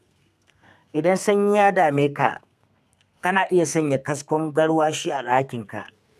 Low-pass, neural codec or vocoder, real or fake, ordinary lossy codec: 14.4 kHz; codec, 32 kHz, 1.9 kbps, SNAC; fake; none